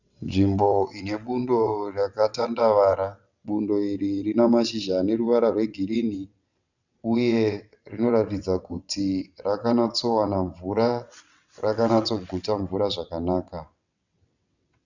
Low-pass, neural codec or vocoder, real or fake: 7.2 kHz; vocoder, 22.05 kHz, 80 mel bands, WaveNeXt; fake